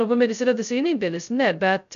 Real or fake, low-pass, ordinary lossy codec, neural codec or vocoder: fake; 7.2 kHz; MP3, 96 kbps; codec, 16 kHz, 0.2 kbps, FocalCodec